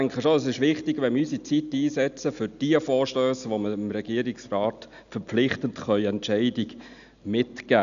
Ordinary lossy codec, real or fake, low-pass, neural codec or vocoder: none; real; 7.2 kHz; none